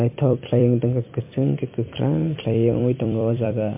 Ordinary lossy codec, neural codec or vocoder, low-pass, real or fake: MP3, 24 kbps; vocoder, 44.1 kHz, 128 mel bands every 256 samples, BigVGAN v2; 3.6 kHz; fake